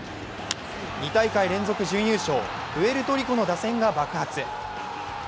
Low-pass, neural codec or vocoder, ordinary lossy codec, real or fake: none; none; none; real